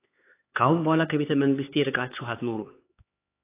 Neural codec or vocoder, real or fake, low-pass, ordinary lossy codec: codec, 16 kHz, 4 kbps, X-Codec, HuBERT features, trained on LibriSpeech; fake; 3.6 kHz; AAC, 24 kbps